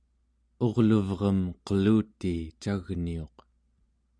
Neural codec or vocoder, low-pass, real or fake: none; 9.9 kHz; real